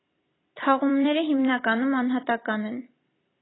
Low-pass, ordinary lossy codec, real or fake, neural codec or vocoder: 7.2 kHz; AAC, 16 kbps; fake; vocoder, 44.1 kHz, 128 mel bands every 256 samples, BigVGAN v2